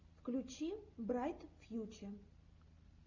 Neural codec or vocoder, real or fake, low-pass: none; real; 7.2 kHz